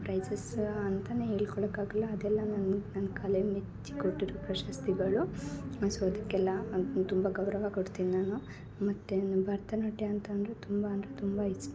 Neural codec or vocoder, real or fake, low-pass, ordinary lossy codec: none; real; none; none